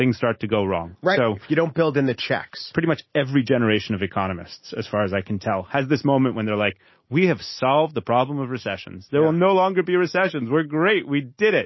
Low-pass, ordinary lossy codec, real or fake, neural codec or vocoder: 7.2 kHz; MP3, 24 kbps; real; none